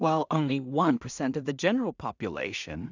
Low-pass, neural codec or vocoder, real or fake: 7.2 kHz; codec, 16 kHz in and 24 kHz out, 0.4 kbps, LongCat-Audio-Codec, two codebook decoder; fake